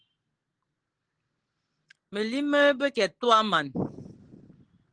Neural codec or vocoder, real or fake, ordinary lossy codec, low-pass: none; real; Opus, 16 kbps; 9.9 kHz